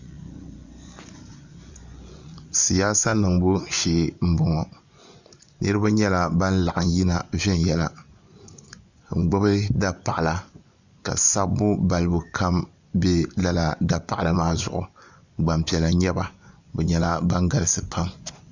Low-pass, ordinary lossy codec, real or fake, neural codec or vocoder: 7.2 kHz; Opus, 64 kbps; fake; vocoder, 24 kHz, 100 mel bands, Vocos